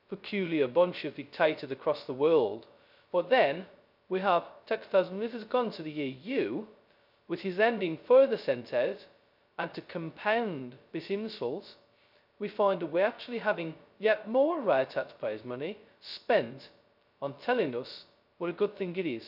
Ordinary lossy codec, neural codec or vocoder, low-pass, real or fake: none; codec, 16 kHz, 0.2 kbps, FocalCodec; 5.4 kHz; fake